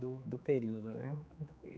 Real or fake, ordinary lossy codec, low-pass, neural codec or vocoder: fake; none; none; codec, 16 kHz, 4 kbps, X-Codec, HuBERT features, trained on general audio